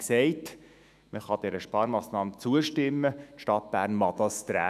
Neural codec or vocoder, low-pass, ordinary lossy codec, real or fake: autoencoder, 48 kHz, 128 numbers a frame, DAC-VAE, trained on Japanese speech; 14.4 kHz; none; fake